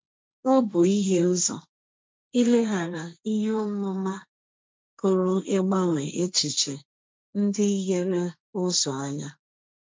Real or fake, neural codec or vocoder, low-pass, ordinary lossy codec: fake; codec, 16 kHz, 1.1 kbps, Voila-Tokenizer; none; none